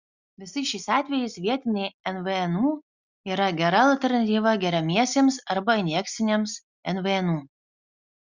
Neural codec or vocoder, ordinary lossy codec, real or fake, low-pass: none; Opus, 64 kbps; real; 7.2 kHz